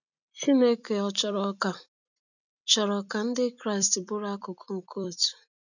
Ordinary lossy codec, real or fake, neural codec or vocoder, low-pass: none; fake; vocoder, 44.1 kHz, 128 mel bands every 256 samples, BigVGAN v2; 7.2 kHz